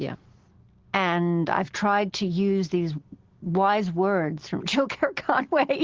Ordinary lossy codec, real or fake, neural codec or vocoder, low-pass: Opus, 16 kbps; real; none; 7.2 kHz